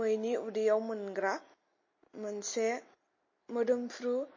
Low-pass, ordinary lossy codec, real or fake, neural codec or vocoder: 7.2 kHz; MP3, 32 kbps; real; none